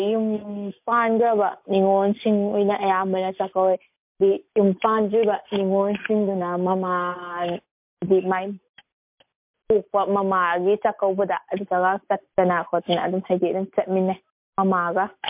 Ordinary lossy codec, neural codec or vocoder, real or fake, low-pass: MP3, 32 kbps; none; real; 3.6 kHz